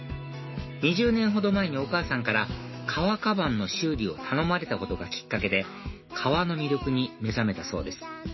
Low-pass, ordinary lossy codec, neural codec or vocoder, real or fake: 7.2 kHz; MP3, 24 kbps; autoencoder, 48 kHz, 128 numbers a frame, DAC-VAE, trained on Japanese speech; fake